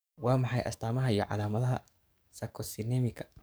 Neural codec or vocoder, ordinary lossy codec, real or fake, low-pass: codec, 44.1 kHz, 7.8 kbps, DAC; none; fake; none